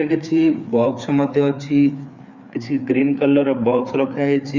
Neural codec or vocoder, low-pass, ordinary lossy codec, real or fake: codec, 16 kHz, 4 kbps, FreqCodec, larger model; 7.2 kHz; Opus, 64 kbps; fake